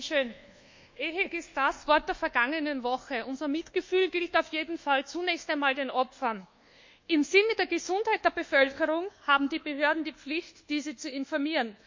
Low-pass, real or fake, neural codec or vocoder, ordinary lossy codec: 7.2 kHz; fake; codec, 24 kHz, 1.2 kbps, DualCodec; MP3, 64 kbps